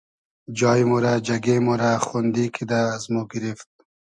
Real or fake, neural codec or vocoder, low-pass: real; none; 10.8 kHz